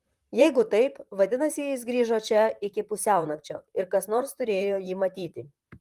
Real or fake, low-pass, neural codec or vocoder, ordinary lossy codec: fake; 14.4 kHz; vocoder, 44.1 kHz, 128 mel bands, Pupu-Vocoder; Opus, 32 kbps